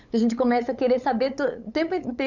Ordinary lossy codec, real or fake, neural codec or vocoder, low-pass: none; fake; codec, 16 kHz, 8 kbps, FunCodec, trained on LibriTTS, 25 frames a second; 7.2 kHz